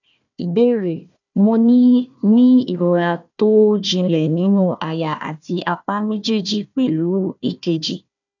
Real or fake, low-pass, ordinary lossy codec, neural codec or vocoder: fake; 7.2 kHz; none; codec, 16 kHz, 1 kbps, FunCodec, trained on Chinese and English, 50 frames a second